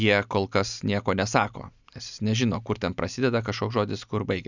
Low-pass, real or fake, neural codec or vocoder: 7.2 kHz; real; none